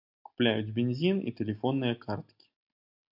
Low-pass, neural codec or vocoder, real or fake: 5.4 kHz; none; real